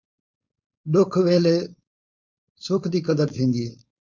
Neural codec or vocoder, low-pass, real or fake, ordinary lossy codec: codec, 16 kHz, 4.8 kbps, FACodec; 7.2 kHz; fake; MP3, 64 kbps